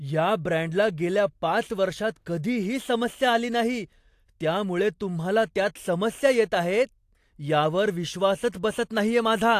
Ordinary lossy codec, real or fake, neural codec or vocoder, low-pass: AAC, 64 kbps; real; none; 14.4 kHz